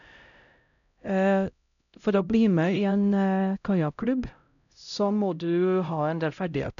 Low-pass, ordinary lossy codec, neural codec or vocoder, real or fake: 7.2 kHz; none; codec, 16 kHz, 0.5 kbps, X-Codec, HuBERT features, trained on LibriSpeech; fake